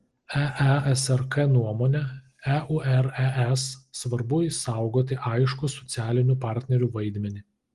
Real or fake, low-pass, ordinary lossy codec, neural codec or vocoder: real; 10.8 kHz; Opus, 24 kbps; none